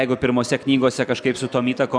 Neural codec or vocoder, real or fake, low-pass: none; real; 9.9 kHz